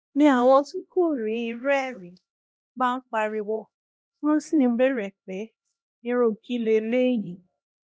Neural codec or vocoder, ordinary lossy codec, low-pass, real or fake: codec, 16 kHz, 1 kbps, X-Codec, HuBERT features, trained on LibriSpeech; none; none; fake